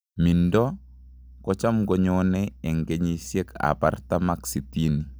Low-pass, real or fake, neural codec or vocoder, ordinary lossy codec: none; real; none; none